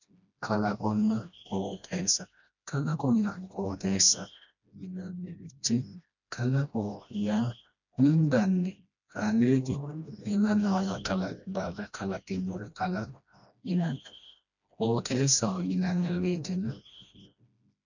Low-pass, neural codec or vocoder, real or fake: 7.2 kHz; codec, 16 kHz, 1 kbps, FreqCodec, smaller model; fake